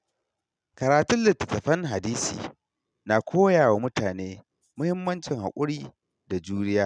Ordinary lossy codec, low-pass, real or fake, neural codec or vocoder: none; 9.9 kHz; real; none